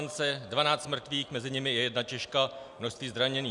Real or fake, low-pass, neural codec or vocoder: real; 10.8 kHz; none